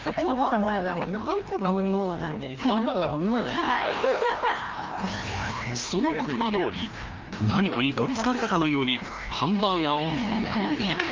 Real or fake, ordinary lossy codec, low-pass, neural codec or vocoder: fake; Opus, 24 kbps; 7.2 kHz; codec, 16 kHz, 1 kbps, FreqCodec, larger model